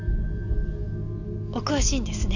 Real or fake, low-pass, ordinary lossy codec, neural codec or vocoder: real; 7.2 kHz; none; none